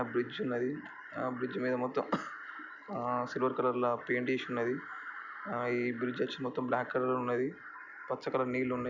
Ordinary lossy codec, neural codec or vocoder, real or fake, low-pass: none; none; real; 7.2 kHz